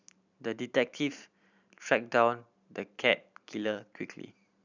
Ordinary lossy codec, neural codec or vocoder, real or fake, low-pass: none; vocoder, 44.1 kHz, 128 mel bands every 512 samples, BigVGAN v2; fake; 7.2 kHz